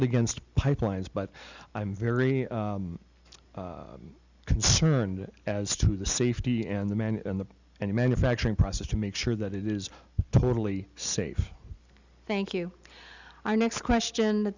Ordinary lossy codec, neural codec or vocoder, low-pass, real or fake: Opus, 64 kbps; none; 7.2 kHz; real